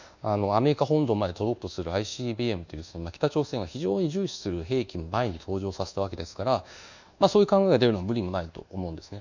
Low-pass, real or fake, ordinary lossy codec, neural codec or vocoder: 7.2 kHz; fake; none; codec, 24 kHz, 1.2 kbps, DualCodec